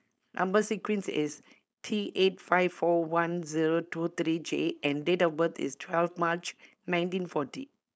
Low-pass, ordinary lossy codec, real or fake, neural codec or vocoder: none; none; fake; codec, 16 kHz, 4.8 kbps, FACodec